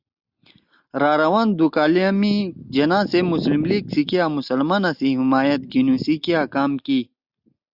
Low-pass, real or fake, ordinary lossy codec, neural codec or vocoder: 5.4 kHz; real; Opus, 64 kbps; none